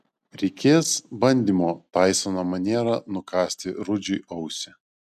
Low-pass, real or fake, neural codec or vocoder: 14.4 kHz; real; none